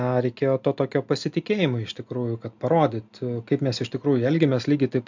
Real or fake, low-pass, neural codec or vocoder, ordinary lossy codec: real; 7.2 kHz; none; MP3, 64 kbps